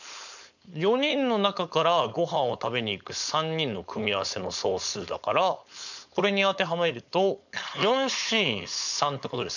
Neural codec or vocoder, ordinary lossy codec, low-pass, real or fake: codec, 16 kHz, 4.8 kbps, FACodec; none; 7.2 kHz; fake